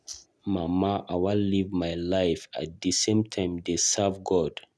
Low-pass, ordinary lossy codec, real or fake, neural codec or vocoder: none; none; real; none